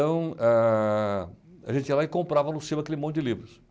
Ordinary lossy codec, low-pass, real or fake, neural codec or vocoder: none; none; real; none